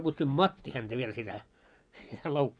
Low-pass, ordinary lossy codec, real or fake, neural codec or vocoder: 9.9 kHz; none; fake; vocoder, 24 kHz, 100 mel bands, Vocos